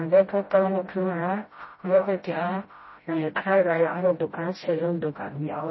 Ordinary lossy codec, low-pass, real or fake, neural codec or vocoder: MP3, 24 kbps; 7.2 kHz; fake; codec, 16 kHz, 0.5 kbps, FreqCodec, smaller model